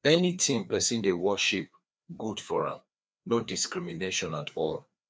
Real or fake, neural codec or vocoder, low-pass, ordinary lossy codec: fake; codec, 16 kHz, 2 kbps, FreqCodec, larger model; none; none